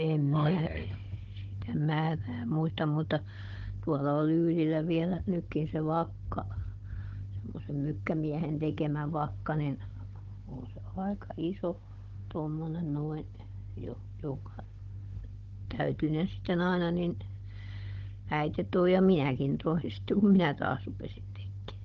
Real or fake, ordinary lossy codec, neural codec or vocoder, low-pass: fake; Opus, 16 kbps; codec, 16 kHz, 16 kbps, FunCodec, trained on Chinese and English, 50 frames a second; 7.2 kHz